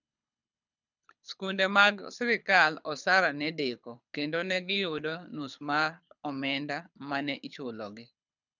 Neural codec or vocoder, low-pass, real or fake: codec, 24 kHz, 6 kbps, HILCodec; 7.2 kHz; fake